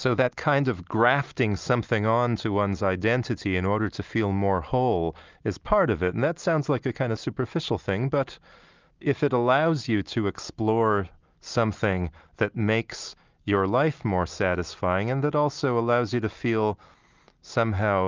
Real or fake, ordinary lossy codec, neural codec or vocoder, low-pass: real; Opus, 24 kbps; none; 7.2 kHz